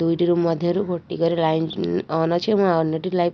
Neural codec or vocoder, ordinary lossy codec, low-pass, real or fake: none; none; none; real